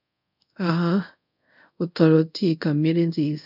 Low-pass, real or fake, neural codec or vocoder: 5.4 kHz; fake; codec, 24 kHz, 0.5 kbps, DualCodec